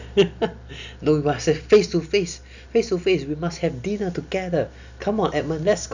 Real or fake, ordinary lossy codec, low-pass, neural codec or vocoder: real; none; 7.2 kHz; none